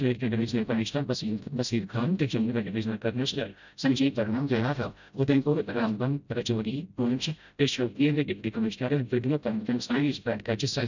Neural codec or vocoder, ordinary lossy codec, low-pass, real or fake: codec, 16 kHz, 0.5 kbps, FreqCodec, smaller model; none; 7.2 kHz; fake